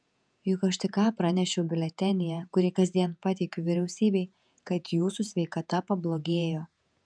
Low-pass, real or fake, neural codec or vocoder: 9.9 kHz; fake; vocoder, 48 kHz, 128 mel bands, Vocos